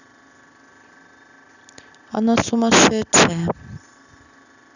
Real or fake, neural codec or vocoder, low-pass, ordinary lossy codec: real; none; 7.2 kHz; none